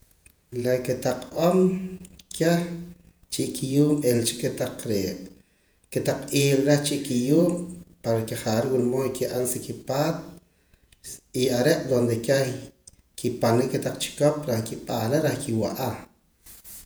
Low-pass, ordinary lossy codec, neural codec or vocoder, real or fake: none; none; vocoder, 48 kHz, 128 mel bands, Vocos; fake